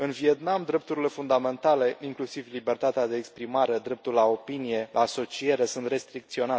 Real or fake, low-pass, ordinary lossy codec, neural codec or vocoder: real; none; none; none